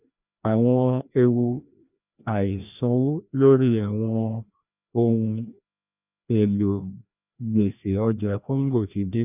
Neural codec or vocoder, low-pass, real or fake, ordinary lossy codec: codec, 16 kHz, 1 kbps, FreqCodec, larger model; 3.6 kHz; fake; none